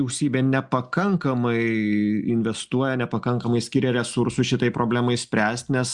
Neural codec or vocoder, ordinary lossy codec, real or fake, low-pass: none; Opus, 64 kbps; real; 10.8 kHz